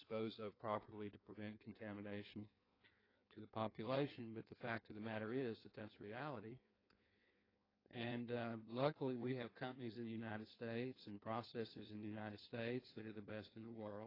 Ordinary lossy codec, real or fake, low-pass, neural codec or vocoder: AAC, 24 kbps; fake; 5.4 kHz; codec, 16 kHz in and 24 kHz out, 1.1 kbps, FireRedTTS-2 codec